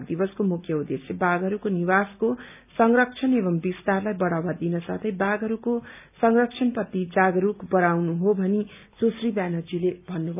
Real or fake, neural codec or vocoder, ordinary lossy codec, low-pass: real; none; none; 3.6 kHz